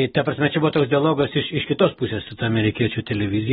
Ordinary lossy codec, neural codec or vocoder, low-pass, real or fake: AAC, 16 kbps; none; 10.8 kHz; real